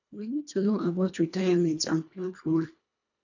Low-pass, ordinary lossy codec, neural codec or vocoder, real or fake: 7.2 kHz; none; codec, 24 kHz, 1.5 kbps, HILCodec; fake